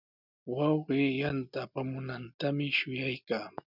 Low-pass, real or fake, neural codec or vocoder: 5.4 kHz; real; none